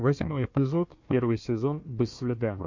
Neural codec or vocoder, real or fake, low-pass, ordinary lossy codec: codec, 16 kHz, 1 kbps, FunCodec, trained on Chinese and English, 50 frames a second; fake; 7.2 kHz; MP3, 48 kbps